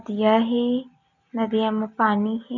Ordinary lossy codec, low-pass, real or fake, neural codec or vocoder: none; 7.2 kHz; real; none